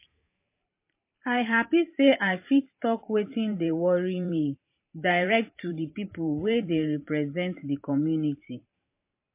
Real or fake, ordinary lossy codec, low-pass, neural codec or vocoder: fake; MP3, 24 kbps; 3.6 kHz; vocoder, 44.1 kHz, 128 mel bands every 512 samples, BigVGAN v2